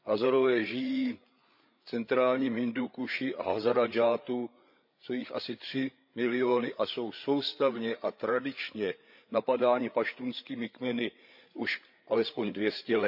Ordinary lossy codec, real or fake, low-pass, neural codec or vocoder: none; fake; 5.4 kHz; codec, 16 kHz, 8 kbps, FreqCodec, larger model